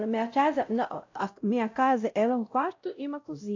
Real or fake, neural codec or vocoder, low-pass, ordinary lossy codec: fake; codec, 16 kHz, 0.5 kbps, X-Codec, WavLM features, trained on Multilingual LibriSpeech; 7.2 kHz; AAC, 48 kbps